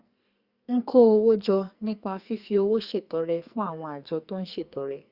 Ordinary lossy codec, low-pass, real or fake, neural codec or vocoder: Opus, 64 kbps; 5.4 kHz; fake; codec, 44.1 kHz, 2.6 kbps, SNAC